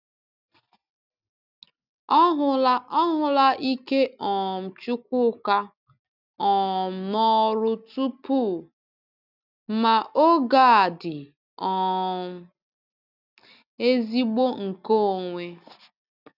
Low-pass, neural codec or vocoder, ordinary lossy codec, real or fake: 5.4 kHz; none; none; real